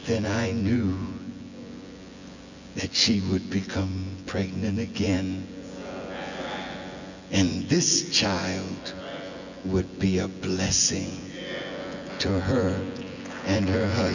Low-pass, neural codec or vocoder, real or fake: 7.2 kHz; vocoder, 24 kHz, 100 mel bands, Vocos; fake